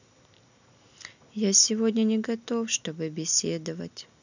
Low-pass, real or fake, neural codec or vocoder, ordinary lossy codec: 7.2 kHz; real; none; none